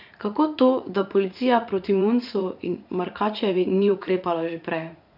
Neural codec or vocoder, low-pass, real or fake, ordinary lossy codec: vocoder, 44.1 kHz, 80 mel bands, Vocos; 5.4 kHz; fake; none